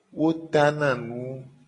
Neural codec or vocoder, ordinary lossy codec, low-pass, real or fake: none; AAC, 32 kbps; 10.8 kHz; real